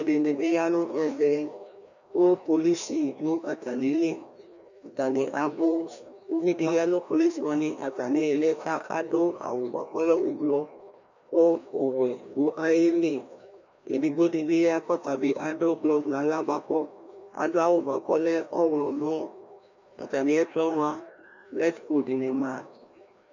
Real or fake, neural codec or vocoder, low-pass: fake; codec, 16 kHz, 1 kbps, FreqCodec, larger model; 7.2 kHz